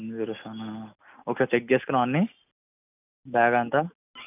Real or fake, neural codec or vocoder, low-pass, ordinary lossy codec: real; none; 3.6 kHz; none